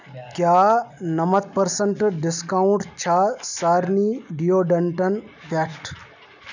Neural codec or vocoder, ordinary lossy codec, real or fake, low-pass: none; none; real; 7.2 kHz